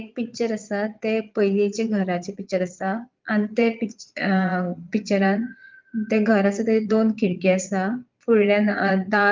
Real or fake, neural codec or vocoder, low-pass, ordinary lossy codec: fake; vocoder, 22.05 kHz, 80 mel bands, Vocos; 7.2 kHz; Opus, 32 kbps